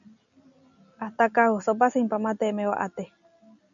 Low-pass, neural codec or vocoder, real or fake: 7.2 kHz; none; real